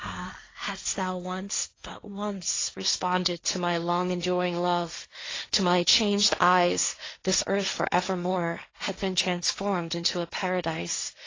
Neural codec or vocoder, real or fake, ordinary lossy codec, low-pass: codec, 16 kHz, 1.1 kbps, Voila-Tokenizer; fake; AAC, 32 kbps; 7.2 kHz